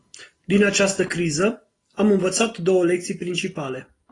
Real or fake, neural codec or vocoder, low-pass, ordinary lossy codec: real; none; 10.8 kHz; AAC, 32 kbps